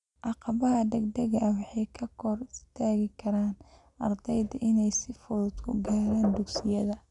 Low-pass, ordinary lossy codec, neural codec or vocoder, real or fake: 10.8 kHz; none; none; real